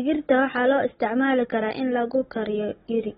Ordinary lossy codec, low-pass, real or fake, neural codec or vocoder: AAC, 16 kbps; 7.2 kHz; fake; codec, 16 kHz, 16 kbps, FunCodec, trained on Chinese and English, 50 frames a second